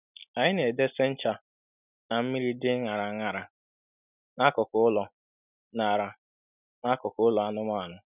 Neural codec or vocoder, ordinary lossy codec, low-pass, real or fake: none; none; 3.6 kHz; real